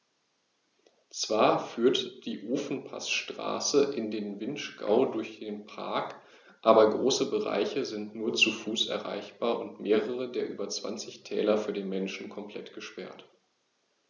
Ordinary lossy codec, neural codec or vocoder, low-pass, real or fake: none; none; 7.2 kHz; real